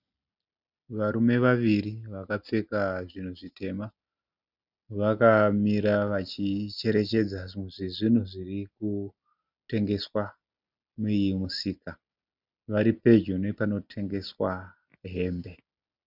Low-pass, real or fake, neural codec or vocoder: 5.4 kHz; real; none